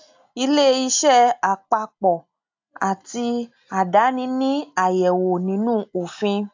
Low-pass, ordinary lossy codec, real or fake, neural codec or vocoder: 7.2 kHz; AAC, 48 kbps; real; none